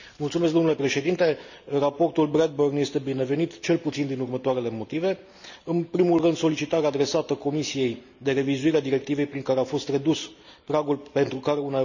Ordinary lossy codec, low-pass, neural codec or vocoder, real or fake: none; 7.2 kHz; none; real